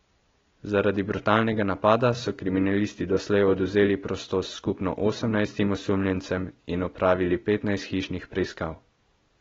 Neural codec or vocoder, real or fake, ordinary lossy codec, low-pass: none; real; AAC, 24 kbps; 7.2 kHz